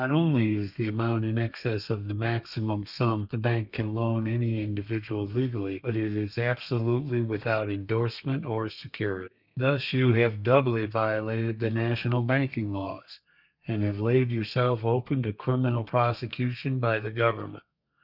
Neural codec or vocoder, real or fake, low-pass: codec, 32 kHz, 1.9 kbps, SNAC; fake; 5.4 kHz